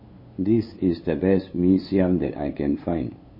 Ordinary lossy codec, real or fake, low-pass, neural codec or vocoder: MP3, 24 kbps; fake; 5.4 kHz; codec, 16 kHz, 8 kbps, FunCodec, trained on LibriTTS, 25 frames a second